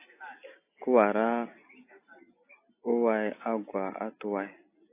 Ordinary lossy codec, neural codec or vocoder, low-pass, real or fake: AAC, 24 kbps; none; 3.6 kHz; real